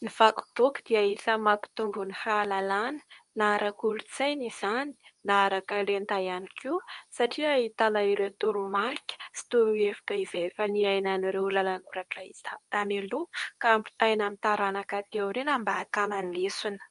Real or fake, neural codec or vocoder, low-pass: fake; codec, 24 kHz, 0.9 kbps, WavTokenizer, medium speech release version 2; 10.8 kHz